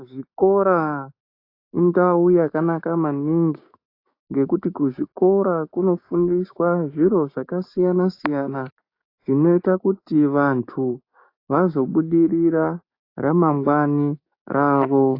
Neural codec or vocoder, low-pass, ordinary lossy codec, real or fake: codec, 16 kHz, 6 kbps, DAC; 5.4 kHz; AAC, 32 kbps; fake